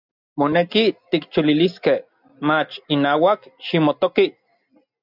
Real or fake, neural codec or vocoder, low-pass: real; none; 5.4 kHz